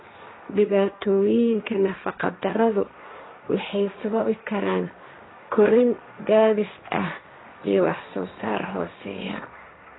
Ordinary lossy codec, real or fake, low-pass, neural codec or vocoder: AAC, 16 kbps; fake; 7.2 kHz; codec, 16 kHz, 1.1 kbps, Voila-Tokenizer